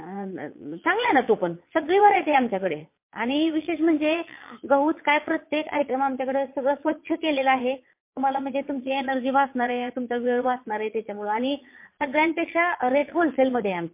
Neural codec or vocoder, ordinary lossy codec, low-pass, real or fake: vocoder, 22.05 kHz, 80 mel bands, Vocos; MP3, 24 kbps; 3.6 kHz; fake